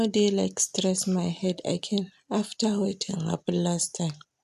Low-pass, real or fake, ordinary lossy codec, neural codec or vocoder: 14.4 kHz; real; none; none